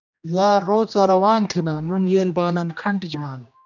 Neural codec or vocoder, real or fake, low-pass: codec, 16 kHz, 1 kbps, X-Codec, HuBERT features, trained on general audio; fake; 7.2 kHz